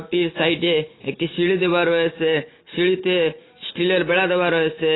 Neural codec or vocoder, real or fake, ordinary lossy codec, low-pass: none; real; AAC, 16 kbps; 7.2 kHz